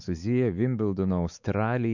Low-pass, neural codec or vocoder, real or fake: 7.2 kHz; codec, 16 kHz, 4 kbps, X-Codec, WavLM features, trained on Multilingual LibriSpeech; fake